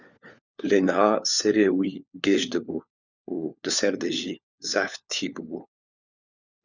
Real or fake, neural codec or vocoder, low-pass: fake; codec, 16 kHz, 4 kbps, FunCodec, trained on LibriTTS, 50 frames a second; 7.2 kHz